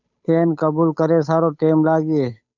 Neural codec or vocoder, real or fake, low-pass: codec, 16 kHz, 8 kbps, FunCodec, trained on Chinese and English, 25 frames a second; fake; 7.2 kHz